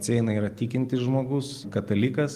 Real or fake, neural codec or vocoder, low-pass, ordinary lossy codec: real; none; 14.4 kHz; Opus, 24 kbps